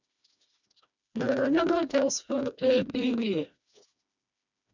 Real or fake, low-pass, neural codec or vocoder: fake; 7.2 kHz; codec, 16 kHz, 2 kbps, FreqCodec, smaller model